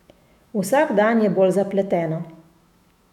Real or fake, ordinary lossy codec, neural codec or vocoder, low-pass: fake; none; autoencoder, 48 kHz, 128 numbers a frame, DAC-VAE, trained on Japanese speech; 19.8 kHz